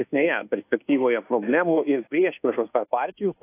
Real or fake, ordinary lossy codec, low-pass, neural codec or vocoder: fake; AAC, 24 kbps; 3.6 kHz; codec, 24 kHz, 1.2 kbps, DualCodec